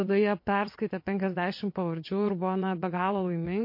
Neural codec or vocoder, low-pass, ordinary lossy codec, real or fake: vocoder, 22.05 kHz, 80 mel bands, WaveNeXt; 5.4 kHz; MP3, 32 kbps; fake